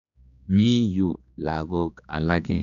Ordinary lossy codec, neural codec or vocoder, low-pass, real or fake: none; codec, 16 kHz, 2 kbps, X-Codec, HuBERT features, trained on general audio; 7.2 kHz; fake